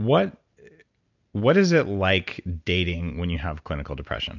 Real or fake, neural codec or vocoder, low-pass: real; none; 7.2 kHz